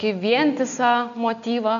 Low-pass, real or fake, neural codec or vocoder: 7.2 kHz; real; none